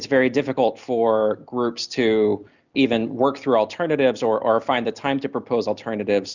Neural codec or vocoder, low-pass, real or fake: none; 7.2 kHz; real